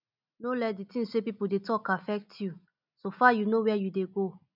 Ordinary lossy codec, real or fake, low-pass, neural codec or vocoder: none; real; 5.4 kHz; none